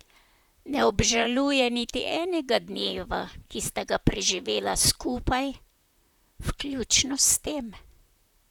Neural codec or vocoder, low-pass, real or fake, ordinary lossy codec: vocoder, 44.1 kHz, 128 mel bands, Pupu-Vocoder; 19.8 kHz; fake; none